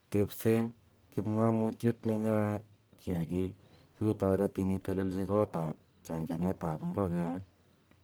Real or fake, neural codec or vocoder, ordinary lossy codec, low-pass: fake; codec, 44.1 kHz, 1.7 kbps, Pupu-Codec; none; none